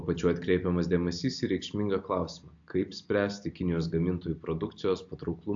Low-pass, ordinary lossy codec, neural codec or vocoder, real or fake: 7.2 kHz; AAC, 64 kbps; none; real